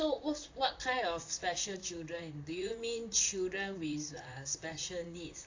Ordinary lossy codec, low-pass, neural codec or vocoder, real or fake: AAC, 48 kbps; 7.2 kHz; vocoder, 44.1 kHz, 128 mel bands, Pupu-Vocoder; fake